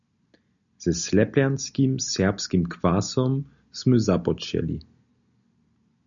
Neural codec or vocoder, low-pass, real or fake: none; 7.2 kHz; real